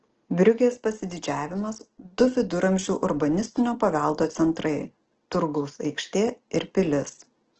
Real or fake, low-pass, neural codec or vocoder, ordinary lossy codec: real; 7.2 kHz; none; Opus, 16 kbps